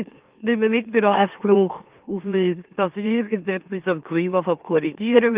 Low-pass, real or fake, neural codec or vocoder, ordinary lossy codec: 3.6 kHz; fake; autoencoder, 44.1 kHz, a latent of 192 numbers a frame, MeloTTS; Opus, 32 kbps